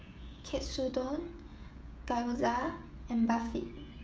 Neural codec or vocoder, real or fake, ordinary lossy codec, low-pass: codec, 16 kHz, 16 kbps, FreqCodec, smaller model; fake; none; none